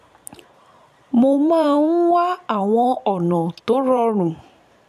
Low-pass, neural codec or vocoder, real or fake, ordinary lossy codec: 14.4 kHz; vocoder, 44.1 kHz, 128 mel bands every 512 samples, BigVGAN v2; fake; none